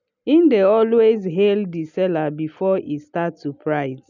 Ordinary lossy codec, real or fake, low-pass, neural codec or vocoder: none; real; 7.2 kHz; none